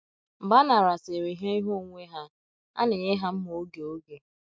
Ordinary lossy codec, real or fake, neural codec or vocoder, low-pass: none; real; none; none